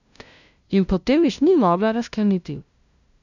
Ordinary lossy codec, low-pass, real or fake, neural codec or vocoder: none; 7.2 kHz; fake; codec, 16 kHz, 0.5 kbps, FunCodec, trained on LibriTTS, 25 frames a second